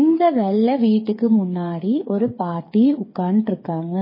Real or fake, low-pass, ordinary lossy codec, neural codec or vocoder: fake; 5.4 kHz; MP3, 24 kbps; codec, 16 kHz, 8 kbps, FreqCodec, smaller model